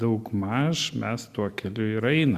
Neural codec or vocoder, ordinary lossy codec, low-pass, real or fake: none; Opus, 64 kbps; 14.4 kHz; real